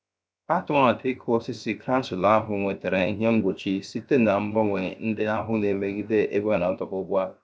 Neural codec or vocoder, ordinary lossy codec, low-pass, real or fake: codec, 16 kHz, 0.7 kbps, FocalCodec; none; none; fake